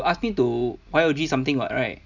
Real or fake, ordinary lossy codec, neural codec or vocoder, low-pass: real; none; none; 7.2 kHz